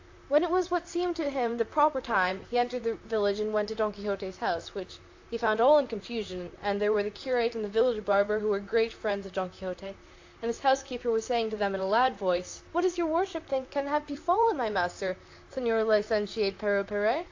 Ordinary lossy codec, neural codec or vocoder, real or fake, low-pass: AAC, 48 kbps; vocoder, 44.1 kHz, 128 mel bands, Pupu-Vocoder; fake; 7.2 kHz